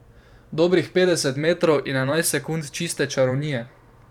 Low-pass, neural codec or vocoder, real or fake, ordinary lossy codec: 19.8 kHz; vocoder, 44.1 kHz, 128 mel bands every 512 samples, BigVGAN v2; fake; none